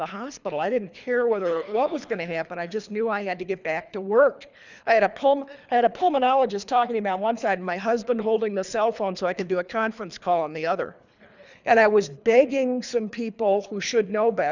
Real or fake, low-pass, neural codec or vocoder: fake; 7.2 kHz; codec, 24 kHz, 3 kbps, HILCodec